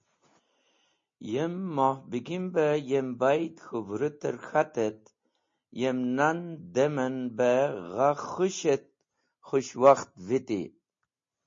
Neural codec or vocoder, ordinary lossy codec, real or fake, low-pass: none; MP3, 32 kbps; real; 7.2 kHz